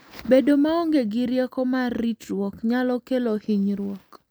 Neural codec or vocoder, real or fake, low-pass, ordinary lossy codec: none; real; none; none